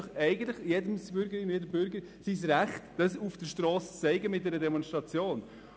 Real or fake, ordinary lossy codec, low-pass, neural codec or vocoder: real; none; none; none